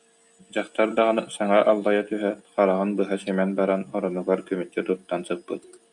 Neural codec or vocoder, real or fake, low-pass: none; real; 10.8 kHz